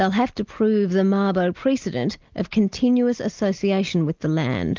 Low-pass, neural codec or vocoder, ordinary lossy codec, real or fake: 7.2 kHz; none; Opus, 32 kbps; real